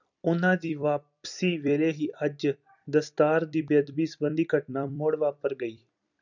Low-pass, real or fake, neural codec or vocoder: 7.2 kHz; fake; vocoder, 44.1 kHz, 128 mel bands every 256 samples, BigVGAN v2